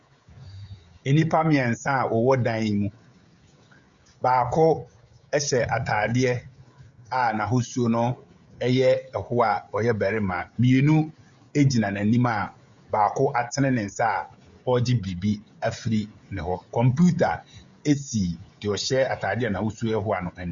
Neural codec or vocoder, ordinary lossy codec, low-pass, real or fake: codec, 16 kHz, 16 kbps, FreqCodec, smaller model; Opus, 64 kbps; 7.2 kHz; fake